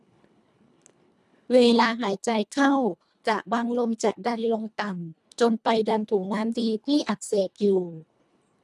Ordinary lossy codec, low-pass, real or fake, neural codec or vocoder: none; none; fake; codec, 24 kHz, 1.5 kbps, HILCodec